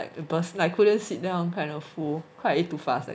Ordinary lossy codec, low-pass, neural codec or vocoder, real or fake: none; none; none; real